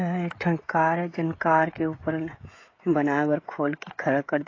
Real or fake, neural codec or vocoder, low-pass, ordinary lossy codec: fake; codec, 16 kHz, 16 kbps, FreqCodec, smaller model; 7.2 kHz; AAC, 32 kbps